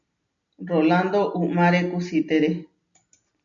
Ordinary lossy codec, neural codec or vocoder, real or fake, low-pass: MP3, 96 kbps; none; real; 7.2 kHz